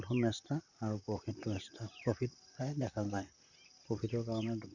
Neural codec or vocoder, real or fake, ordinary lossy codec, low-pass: vocoder, 44.1 kHz, 128 mel bands, Pupu-Vocoder; fake; none; 7.2 kHz